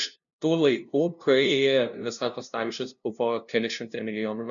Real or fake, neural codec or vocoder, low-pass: fake; codec, 16 kHz, 0.5 kbps, FunCodec, trained on LibriTTS, 25 frames a second; 7.2 kHz